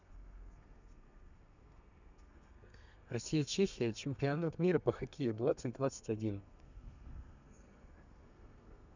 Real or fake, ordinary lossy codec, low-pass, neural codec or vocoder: fake; none; 7.2 kHz; codec, 32 kHz, 1.9 kbps, SNAC